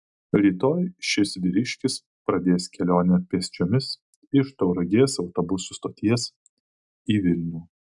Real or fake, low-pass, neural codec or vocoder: real; 10.8 kHz; none